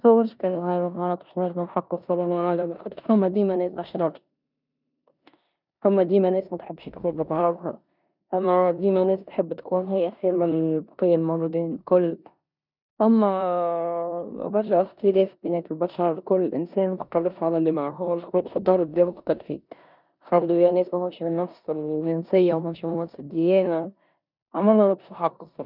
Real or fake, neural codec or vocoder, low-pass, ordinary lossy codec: fake; codec, 16 kHz in and 24 kHz out, 0.9 kbps, LongCat-Audio-Codec, four codebook decoder; 5.4 kHz; none